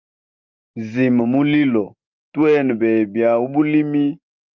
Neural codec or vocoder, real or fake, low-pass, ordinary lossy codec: none; real; 7.2 kHz; Opus, 24 kbps